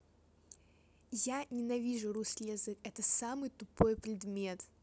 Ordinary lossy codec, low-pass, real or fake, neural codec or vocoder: none; none; real; none